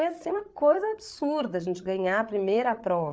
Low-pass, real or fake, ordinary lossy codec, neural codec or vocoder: none; fake; none; codec, 16 kHz, 8 kbps, FreqCodec, larger model